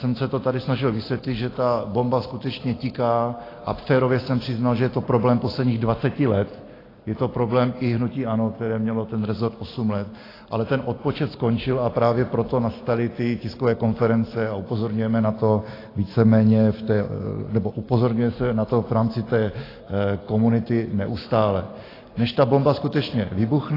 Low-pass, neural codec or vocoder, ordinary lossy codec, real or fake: 5.4 kHz; none; AAC, 24 kbps; real